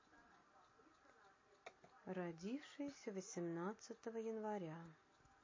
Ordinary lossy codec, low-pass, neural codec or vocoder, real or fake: MP3, 32 kbps; 7.2 kHz; none; real